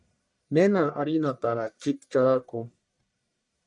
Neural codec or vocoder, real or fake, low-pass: codec, 44.1 kHz, 1.7 kbps, Pupu-Codec; fake; 10.8 kHz